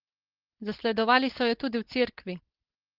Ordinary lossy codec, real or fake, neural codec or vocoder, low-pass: Opus, 16 kbps; fake; codec, 16 kHz, 4.8 kbps, FACodec; 5.4 kHz